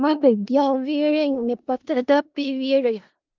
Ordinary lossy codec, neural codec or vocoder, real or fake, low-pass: Opus, 32 kbps; codec, 16 kHz in and 24 kHz out, 0.4 kbps, LongCat-Audio-Codec, four codebook decoder; fake; 7.2 kHz